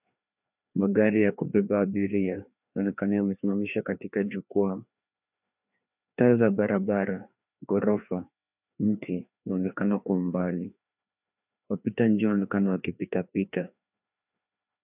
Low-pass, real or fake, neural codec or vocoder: 3.6 kHz; fake; codec, 16 kHz, 2 kbps, FreqCodec, larger model